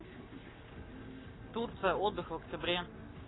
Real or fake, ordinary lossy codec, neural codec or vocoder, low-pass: fake; AAC, 16 kbps; codec, 44.1 kHz, 7.8 kbps, Pupu-Codec; 7.2 kHz